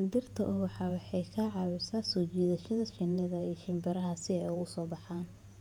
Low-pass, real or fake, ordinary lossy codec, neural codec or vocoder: 19.8 kHz; fake; none; vocoder, 48 kHz, 128 mel bands, Vocos